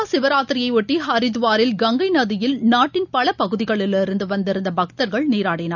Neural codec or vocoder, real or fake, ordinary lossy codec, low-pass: none; real; none; 7.2 kHz